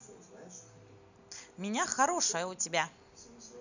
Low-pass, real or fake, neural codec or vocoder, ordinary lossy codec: 7.2 kHz; real; none; none